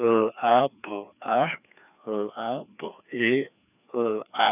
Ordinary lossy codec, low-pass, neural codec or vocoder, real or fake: none; 3.6 kHz; codec, 16 kHz, 2 kbps, FreqCodec, larger model; fake